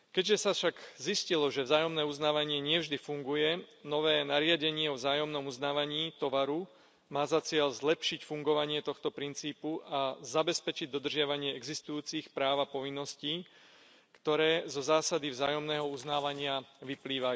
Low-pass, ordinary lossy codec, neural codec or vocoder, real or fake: none; none; none; real